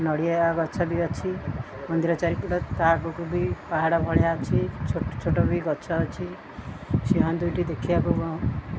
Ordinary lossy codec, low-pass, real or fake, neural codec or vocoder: none; none; real; none